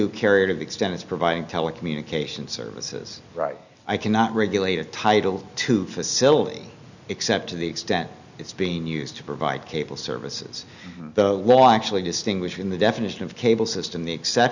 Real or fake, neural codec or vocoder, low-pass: real; none; 7.2 kHz